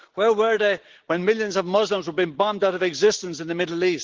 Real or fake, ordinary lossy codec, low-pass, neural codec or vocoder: real; Opus, 16 kbps; 7.2 kHz; none